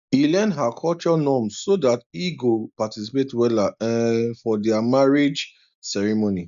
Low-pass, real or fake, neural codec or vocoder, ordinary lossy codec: 7.2 kHz; real; none; none